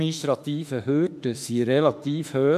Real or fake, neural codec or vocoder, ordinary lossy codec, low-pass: fake; autoencoder, 48 kHz, 32 numbers a frame, DAC-VAE, trained on Japanese speech; MP3, 96 kbps; 14.4 kHz